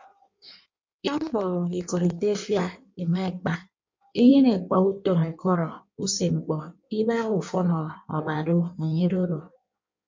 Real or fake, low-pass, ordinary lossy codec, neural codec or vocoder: fake; 7.2 kHz; MP3, 48 kbps; codec, 16 kHz in and 24 kHz out, 1.1 kbps, FireRedTTS-2 codec